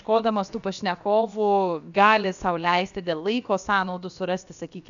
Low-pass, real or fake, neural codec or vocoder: 7.2 kHz; fake; codec, 16 kHz, about 1 kbps, DyCAST, with the encoder's durations